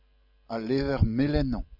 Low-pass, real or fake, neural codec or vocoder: 5.4 kHz; real; none